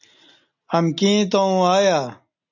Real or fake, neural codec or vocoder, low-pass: real; none; 7.2 kHz